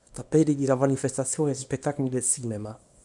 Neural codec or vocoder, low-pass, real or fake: codec, 24 kHz, 0.9 kbps, WavTokenizer, small release; 10.8 kHz; fake